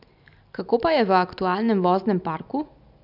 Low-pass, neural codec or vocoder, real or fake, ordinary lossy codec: 5.4 kHz; none; real; none